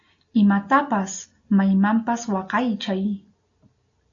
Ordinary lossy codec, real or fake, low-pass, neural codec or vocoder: AAC, 64 kbps; real; 7.2 kHz; none